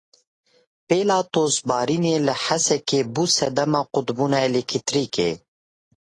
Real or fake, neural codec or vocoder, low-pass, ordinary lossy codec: real; none; 10.8 kHz; AAC, 32 kbps